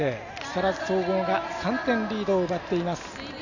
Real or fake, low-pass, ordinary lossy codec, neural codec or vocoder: real; 7.2 kHz; none; none